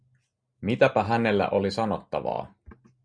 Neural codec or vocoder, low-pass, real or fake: none; 9.9 kHz; real